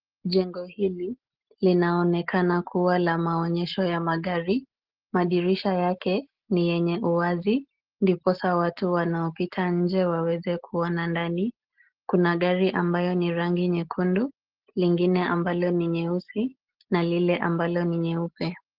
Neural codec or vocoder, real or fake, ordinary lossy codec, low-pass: none; real; Opus, 16 kbps; 5.4 kHz